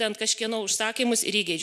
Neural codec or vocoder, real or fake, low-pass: none; real; 14.4 kHz